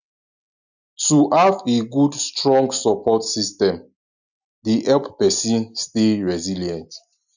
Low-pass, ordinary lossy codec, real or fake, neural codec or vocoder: 7.2 kHz; none; real; none